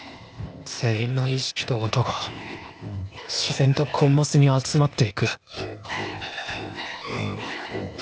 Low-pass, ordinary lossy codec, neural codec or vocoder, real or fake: none; none; codec, 16 kHz, 0.8 kbps, ZipCodec; fake